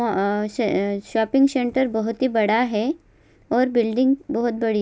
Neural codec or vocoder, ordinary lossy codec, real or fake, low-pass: none; none; real; none